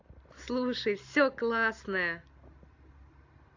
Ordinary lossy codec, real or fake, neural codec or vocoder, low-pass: AAC, 48 kbps; real; none; 7.2 kHz